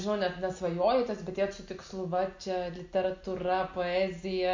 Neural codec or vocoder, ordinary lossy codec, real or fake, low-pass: none; MP3, 48 kbps; real; 7.2 kHz